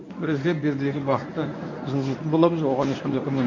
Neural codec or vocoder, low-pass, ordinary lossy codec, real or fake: codec, 24 kHz, 0.9 kbps, WavTokenizer, medium speech release version 1; 7.2 kHz; AAC, 48 kbps; fake